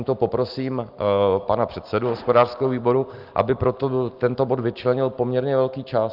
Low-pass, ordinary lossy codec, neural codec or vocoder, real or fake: 5.4 kHz; Opus, 32 kbps; none; real